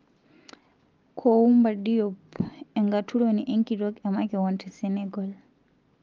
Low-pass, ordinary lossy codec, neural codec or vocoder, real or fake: 7.2 kHz; Opus, 24 kbps; none; real